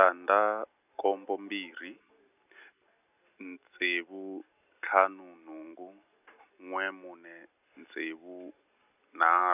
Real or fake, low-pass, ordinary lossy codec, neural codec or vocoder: real; 3.6 kHz; none; none